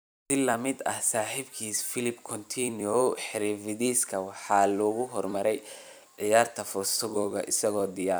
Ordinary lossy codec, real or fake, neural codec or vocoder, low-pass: none; fake; vocoder, 44.1 kHz, 128 mel bands every 256 samples, BigVGAN v2; none